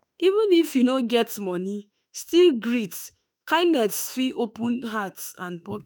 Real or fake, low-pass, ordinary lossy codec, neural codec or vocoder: fake; none; none; autoencoder, 48 kHz, 32 numbers a frame, DAC-VAE, trained on Japanese speech